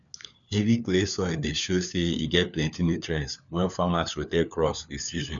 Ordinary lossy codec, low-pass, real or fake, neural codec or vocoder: none; 7.2 kHz; fake; codec, 16 kHz, 4 kbps, FunCodec, trained on LibriTTS, 50 frames a second